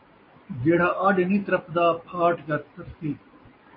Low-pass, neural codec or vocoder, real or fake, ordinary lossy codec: 5.4 kHz; none; real; MP3, 24 kbps